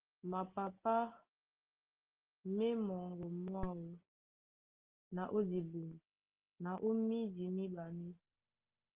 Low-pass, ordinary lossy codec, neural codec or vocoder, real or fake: 3.6 kHz; Opus, 16 kbps; none; real